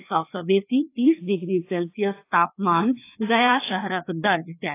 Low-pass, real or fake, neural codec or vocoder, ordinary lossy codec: 3.6 kHz; fake; codec, 16 kHz, 2 kbps, FreqCodec, larger model; AAC, 24 kbps